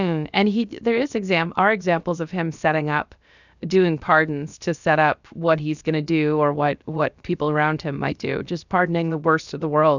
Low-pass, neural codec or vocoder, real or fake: 7.2 kHz; codec, 16 kHz, about 1 kbps, DyCAST, with the encoder's durations; fake